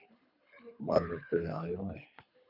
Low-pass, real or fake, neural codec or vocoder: 5.4 kHz; fake; codec, 24 kHz, 3 kbps, HILCodec